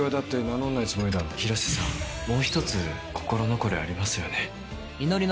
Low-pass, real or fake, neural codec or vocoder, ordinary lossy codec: none; real; none; none